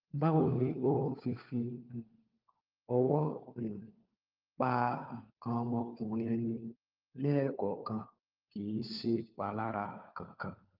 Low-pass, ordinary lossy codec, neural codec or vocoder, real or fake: 5.4 kHz; Opus, 24 kbps; codec, 16 kHz, 4 kbps, FunCodec, trained on LibriTTS, 50 frames a second; fake